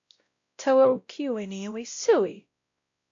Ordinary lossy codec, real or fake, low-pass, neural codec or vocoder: AAC, 48 kbps; fake; 7.2 kHz; codec, 16 kHz, 0.5 kbps, X-Codec, WavLM features, trained on Multilingual LibriSpeech